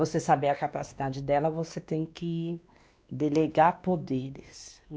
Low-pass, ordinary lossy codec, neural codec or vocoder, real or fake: none; none; codec, 16 kHz, 2 kbps, X-Codec, WavLM features, trained on Multilingual LibriSpeech; fake